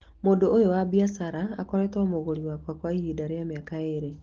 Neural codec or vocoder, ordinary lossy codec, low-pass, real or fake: none; Opus, 16 kbps; 7.2 kHz; real